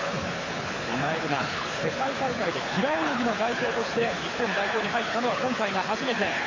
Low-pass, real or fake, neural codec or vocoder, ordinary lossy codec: 7.2 kHz; fake; codec, 24 kHz, 6 kbps, HILCodec; AAC, 32 kbps